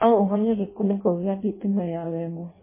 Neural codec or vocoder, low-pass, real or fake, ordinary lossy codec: codec, 16 kHz in and 24 kHz out, 0.6 kbps, FireRedTTS-2 codec; 3.6 kHz; fake; MP3, 16 kbps